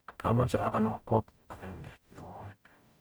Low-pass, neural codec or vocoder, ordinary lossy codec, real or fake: none; codec, 44.1 kHz, 0.9 kbps, DAC; none; fake